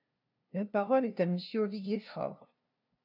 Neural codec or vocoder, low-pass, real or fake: codec, 16 kHz, 0.5 kbps, FunCodec, trained on LibriTTS, 25 frames a second; 5.4 kHz; fake